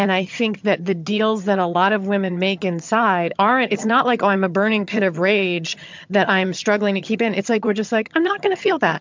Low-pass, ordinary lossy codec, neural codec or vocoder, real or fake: 7.2 kHz; MP3, 64 kbps; vocoder, 22.05 kHz, 80 mel bands, HiFi-GAN; fake